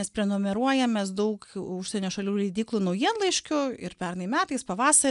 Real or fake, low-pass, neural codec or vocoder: real; 10.8 kHz; none